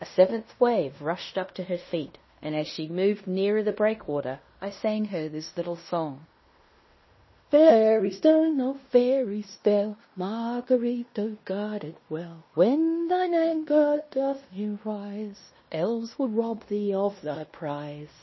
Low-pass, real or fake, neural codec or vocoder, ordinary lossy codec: 7.2 kHz; fake; codec, 16 kHz in and 24 kHz out, 0.9 kbps, LongCat-Audio-Codec, fine tuned four codebook decoder; MP3, 24 kbps